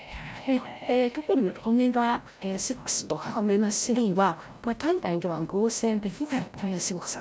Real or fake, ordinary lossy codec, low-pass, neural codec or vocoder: fake; none; none; codec, 16 kHz, 0.5 kbps, FreqCodec, larger model